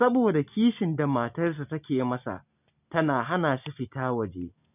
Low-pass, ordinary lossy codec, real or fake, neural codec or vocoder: 3.6 kHz; none; real; none